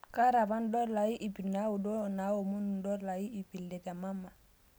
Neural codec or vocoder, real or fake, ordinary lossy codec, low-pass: none; real; none; none